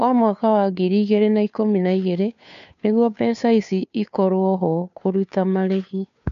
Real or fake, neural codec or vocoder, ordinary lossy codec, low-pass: fake; codec, 16 kHz, 2 kbps, FunCodec, trained on Chinese and English, 25 frames a second; none; 7.2 kHz